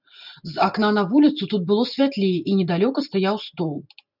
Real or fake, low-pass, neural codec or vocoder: real; 5.4 kHz; none